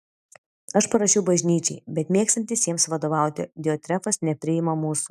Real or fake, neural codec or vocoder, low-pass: real; none; 14.4 kHz